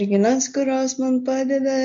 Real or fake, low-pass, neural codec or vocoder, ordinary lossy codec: real; 7.2 kHz; none; MP3, 48 kbps